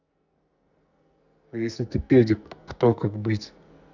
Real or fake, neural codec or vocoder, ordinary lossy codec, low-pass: fake; codec, 44.1 kHz, 2.6 kbps, SNAC; none; 7.2 kHz